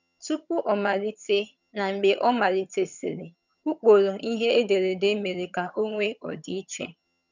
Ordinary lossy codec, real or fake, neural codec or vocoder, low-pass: none; fake; vocoder, 22.05 kHz, 80 mel bands, HiFi-GAN; 7.2 kHz